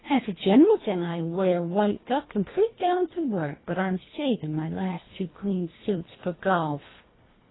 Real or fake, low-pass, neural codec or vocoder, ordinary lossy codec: fake; 7.2 kHz; codec, 24 kHz, 1.5 kbps, HILCodec; AAC, 16 kbps